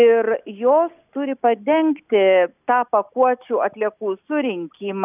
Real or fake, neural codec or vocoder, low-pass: real; none; 3.6 kHz